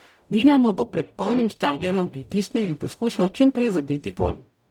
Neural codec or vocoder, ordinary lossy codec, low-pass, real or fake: codec, 44.1 kHz, 0.9 kbps, DAC; none; 19.8 kHz; fake